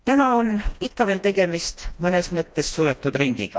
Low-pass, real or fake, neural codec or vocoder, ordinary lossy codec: none; fake; codec, 16 kHz, 1 kbps, FreqCodec, smaller model; none